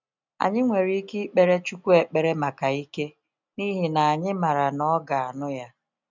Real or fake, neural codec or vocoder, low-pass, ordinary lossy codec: real; none; 7.2 kHz; none